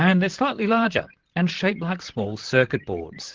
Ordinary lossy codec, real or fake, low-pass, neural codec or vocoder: Opus, 16 kbps; fake; 7.2 kHz; vocoder, 44.1 kHz, 128 mel bands, Pupu-Vocoder